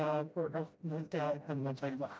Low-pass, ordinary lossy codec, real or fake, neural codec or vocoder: none; none; fake; codec, 16 kHz, 0.5 kbps, FreqCodec, smaller model